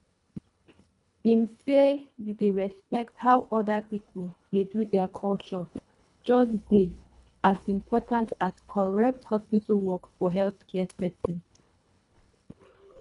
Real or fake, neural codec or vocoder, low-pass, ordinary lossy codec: fake; codec, 24 kHz, 1.5 kbps, HILCodec; 10.8 kHz; none